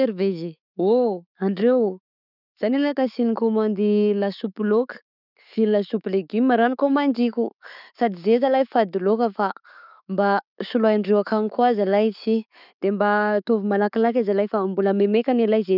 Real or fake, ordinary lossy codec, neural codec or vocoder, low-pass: real; none; none; 5.4 kHz